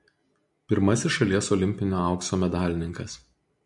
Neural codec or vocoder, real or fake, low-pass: none; real; 10.8 kHz